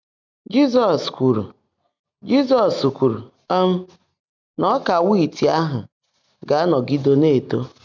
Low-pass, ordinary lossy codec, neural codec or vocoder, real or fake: 7.2 kHz; none; none; real